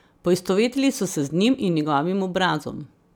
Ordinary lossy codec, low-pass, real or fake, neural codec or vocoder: none; none; real; none